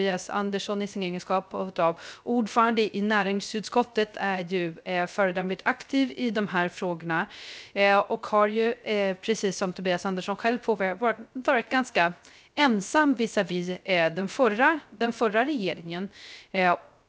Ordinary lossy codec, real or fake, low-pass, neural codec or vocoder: none; fake; none; codec, 16 kHz, 0.3 kbps, FocalCodec